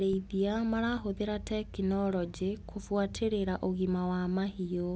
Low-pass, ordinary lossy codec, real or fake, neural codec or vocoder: none; none; real; none